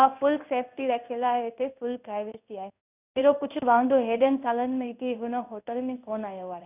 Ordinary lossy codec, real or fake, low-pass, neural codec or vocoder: none; fake; 3.6 kHz; codec, 16 kHz in and 24 kHz out, 1 kbps, XY-Tokenizer